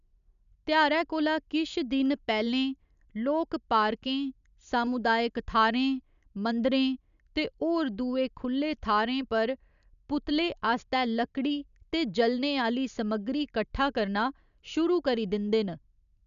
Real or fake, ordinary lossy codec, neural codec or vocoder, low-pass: real; none; none; 7.2 kHz